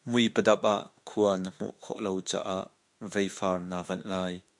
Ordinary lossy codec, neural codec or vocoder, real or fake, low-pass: MP3, 64 kbps; autoencoder, 48 kHz, 32 numbers a frame, DAC-VAE, trained on Japanese speech; fake; 10.8 kHz